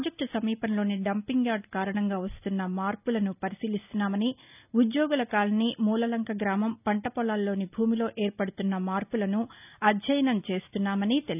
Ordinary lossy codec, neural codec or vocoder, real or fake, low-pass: none; none; real; 3.6 kHz